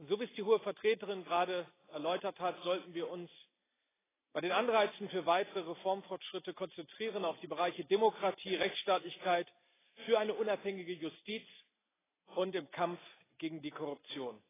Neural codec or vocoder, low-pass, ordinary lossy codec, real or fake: none; 3.6 kHz; AAC, 16 kbps; real